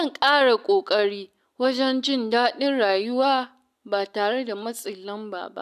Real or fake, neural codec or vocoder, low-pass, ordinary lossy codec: real; none; 14.4 kHz; none